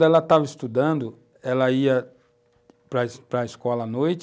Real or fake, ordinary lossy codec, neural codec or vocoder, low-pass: real; none; none; none